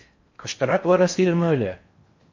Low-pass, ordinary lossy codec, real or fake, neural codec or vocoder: 7.2 kHz; MP3, 48 kbps; fake; codec, 16 kHz in and 24 kHz out, 0.6 kbps, FocalCodec, streaming, 4096 codes